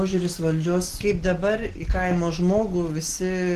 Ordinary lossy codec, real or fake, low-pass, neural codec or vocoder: Opus, 16 kbps; real; 14.4 kHz; none